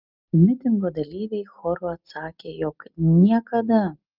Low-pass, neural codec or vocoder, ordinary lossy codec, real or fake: 5.4 kHz; none; Opus, 24 kbps; real